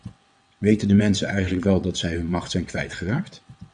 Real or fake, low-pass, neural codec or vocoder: fake; 9.9 kHz; vocoder, 22.05 kHz, 80 mel bands, WaveNeXt